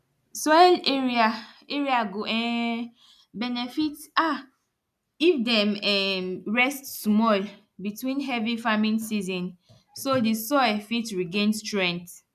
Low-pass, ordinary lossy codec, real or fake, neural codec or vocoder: 14.4 kHz; none; real; none